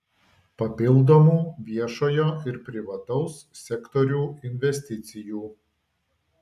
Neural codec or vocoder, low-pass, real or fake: none; 14.4 kHz; real